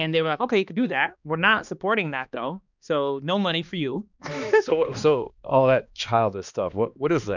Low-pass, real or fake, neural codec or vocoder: 7.2 kHz; fake; codec, 16 kHz, 2 kbps, X-Codec, HuBERT features, trained on balanced general audio